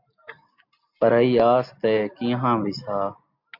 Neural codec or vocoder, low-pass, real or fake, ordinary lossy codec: none; 5.4 kHz; real; MP3, 48 kbps